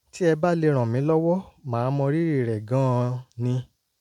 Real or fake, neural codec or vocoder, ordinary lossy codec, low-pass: real; none; MP3, 96 kbps; 19.8 kHz